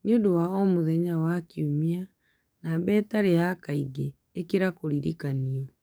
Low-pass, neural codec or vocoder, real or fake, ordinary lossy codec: none; codec, 44.1 kHz, 7.8 kbps, DAC; fake; none